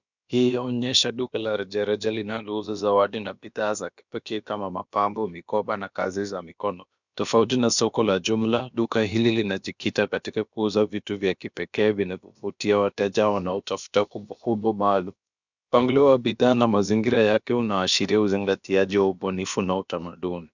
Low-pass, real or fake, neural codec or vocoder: 7.2 kHz; fake; codec, 16 kHz, about 1 kbps, DyCAST, with the encoder's durations